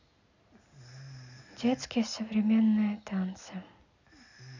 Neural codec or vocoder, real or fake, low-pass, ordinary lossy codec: none; real; 7.2 kHz; none